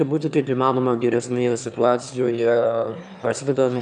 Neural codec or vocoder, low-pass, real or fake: autoencoder, 22.05 kHz, a latent of 192 numbers a frame, VITS, trained on one speaker; 9.9 kHz; fake